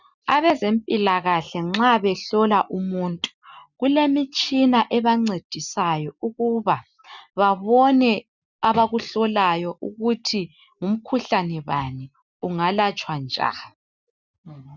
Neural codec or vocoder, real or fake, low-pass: none; real; 7.2 kHz